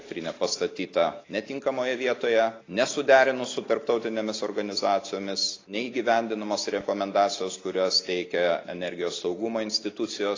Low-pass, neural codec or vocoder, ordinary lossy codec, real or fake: 7.2 kHz; none; AAC, 32 kbps; real